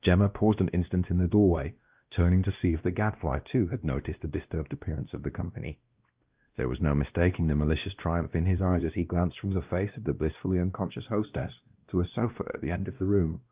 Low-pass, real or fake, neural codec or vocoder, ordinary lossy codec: 3.6 kHz; fake; codec, 16 kHz, 1 kbps, X-Codec, WavLM features, trained on Multilingual LibriSpeech; Opus, 32 kbps